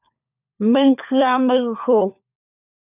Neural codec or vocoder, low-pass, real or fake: codec, 16 kHz, 4 kbps, FunCodec, trained on LibriTTS, 50 frames a second; 3.6 kHz; fake